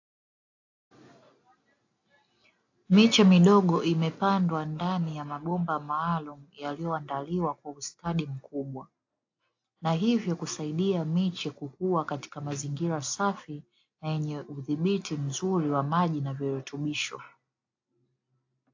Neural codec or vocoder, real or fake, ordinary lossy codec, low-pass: none; real; AAC, 48 kbps; 7.2 kHz